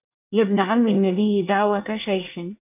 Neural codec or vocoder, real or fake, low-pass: codec, 24 kHz, 1 kbps, SNAC; fake; 3.6 kHz